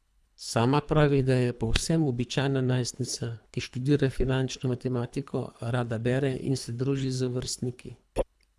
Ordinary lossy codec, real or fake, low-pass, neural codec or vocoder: none; fake; none; codec, 24 kHz, 3 kbps, HILCodec